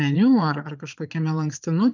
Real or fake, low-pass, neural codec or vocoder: fake; 7.2 kHz; vocoder, 22.05 kHz, 80 mel bands, Vocos